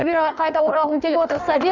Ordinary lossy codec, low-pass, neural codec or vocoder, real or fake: none; 7.2 kHz; codec, 16 kHz in and 24 kHz out, 1.1 kbps, FireRedTTS-2 codec; fake